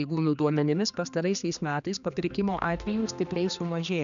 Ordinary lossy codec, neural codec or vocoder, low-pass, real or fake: MP3, 96 kbps; codec, 16 kHz, 2 kbps, X-Codec, HuBERT features, trained on general audio; 7.2 kHz; fake